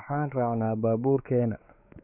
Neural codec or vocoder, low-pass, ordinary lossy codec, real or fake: codec, 16 kHz, 4 kbps, X-Codec, WavLM features, trained on Multilingual LibriSpeech; 3.6 kHz; none; fake